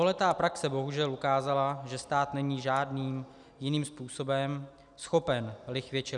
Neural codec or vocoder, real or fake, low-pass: none; real; 10.8 kHz